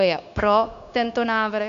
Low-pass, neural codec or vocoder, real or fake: 7.2 kHz; codec, 16 kHz, 0.9 kbps, LongCat-Audio-Codec; fake